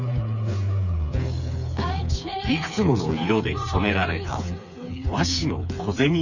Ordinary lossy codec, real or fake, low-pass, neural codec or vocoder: none; fake; 7.2 kHz; codec, 16 kHz, 4 kbps, FreqCodec, smaller model